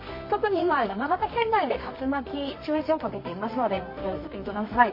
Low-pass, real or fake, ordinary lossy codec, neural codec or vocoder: 5.4 kHz; fake; MP3, 24 kbps; codec, 24 kHz, 0.9 kbps, WavTokenizer, medium music audio release